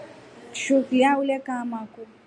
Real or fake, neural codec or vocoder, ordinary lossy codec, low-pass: real; none; MP3, 96 kbps; 9.9 kHz